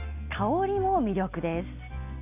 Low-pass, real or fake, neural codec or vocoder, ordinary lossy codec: 3.6 kHz; real; none; none